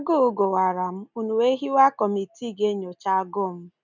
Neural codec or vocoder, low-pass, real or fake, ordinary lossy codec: none; 7.2 kHz; real; none